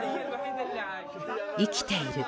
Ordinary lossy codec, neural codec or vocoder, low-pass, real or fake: none; none; none; real